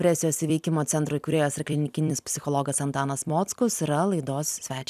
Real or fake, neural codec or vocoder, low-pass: fake; vocoder, 44.1 kHz, 128 mel bands every 256 samples, BigVGAN v2; 14.4 kHz